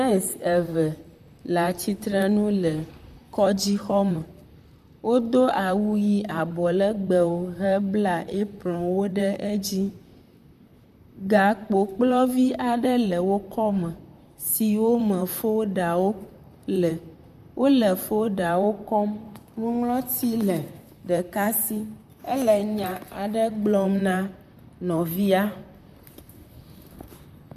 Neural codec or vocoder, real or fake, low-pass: vocoder, 44.1 kHz, 128 mel bands, Pupu-Vocoder; fake; 14.4 kHz